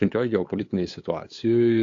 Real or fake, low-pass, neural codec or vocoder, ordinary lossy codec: fake; 7.2 kHz; codec, 16 kHz, 8 kbps, FunCodec, trained on LibriTTS, 25 frames a second; AAC, 48 kbps